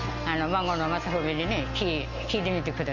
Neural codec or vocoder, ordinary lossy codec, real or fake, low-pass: none; Opus, 32 kbps; real; 7.2 kHz